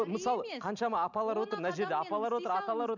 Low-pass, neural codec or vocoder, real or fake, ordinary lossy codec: 7.2 kHz; none; real; none